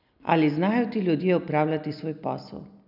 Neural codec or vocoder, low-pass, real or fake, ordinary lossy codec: none; 5.4 kHz; real; none